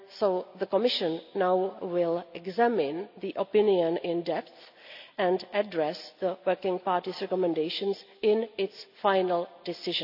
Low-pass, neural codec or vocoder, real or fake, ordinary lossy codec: 5.4 kHz; none; real; none